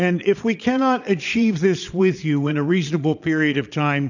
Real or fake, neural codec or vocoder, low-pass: fake; vocoder, 22.05 kHz, 80 mel bands, Vocos; 7.2 kHz